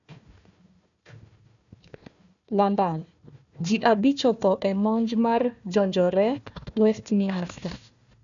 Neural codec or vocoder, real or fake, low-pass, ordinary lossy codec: codec, 16 kHz, 1 kbps, FunCodec, trained on Chinese and English, 50 frames a second; fake; 7.2 kHz; none